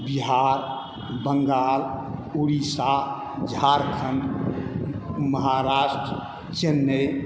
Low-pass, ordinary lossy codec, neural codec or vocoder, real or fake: none; none; none; real